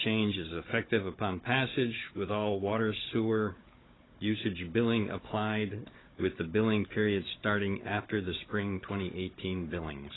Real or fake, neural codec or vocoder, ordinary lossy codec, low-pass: fake; codec, 16 kHz, 16 kbps, FunCodec, trained on Chinese and English, 50 frames a second; AAC, 16 kbps; 7.2 kHz